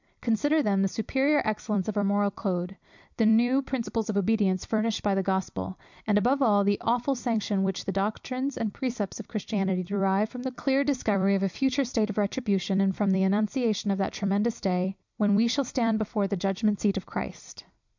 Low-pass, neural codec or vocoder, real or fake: 7.2 kHz; vocoder, 44.1 kHz, 128 mel bands every 256 samples, BigVGAN v2; fake